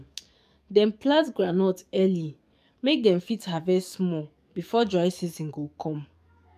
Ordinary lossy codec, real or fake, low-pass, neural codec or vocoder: none; fake; 14.4 kHz; codec, 44.1 kHz, 7.8 kbps, DAC